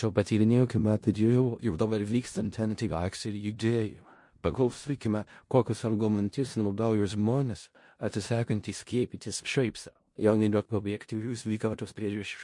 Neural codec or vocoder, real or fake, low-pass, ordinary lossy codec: codec, 16 kHz in and 24 kHz out, 0.4 kbps, LongCat-Audio-Codec, four codebook decoder; fake; 10.8 kHz; MP3, 48 kbps